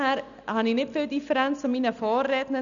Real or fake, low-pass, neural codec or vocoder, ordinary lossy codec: real; 7.2 kHz; none; none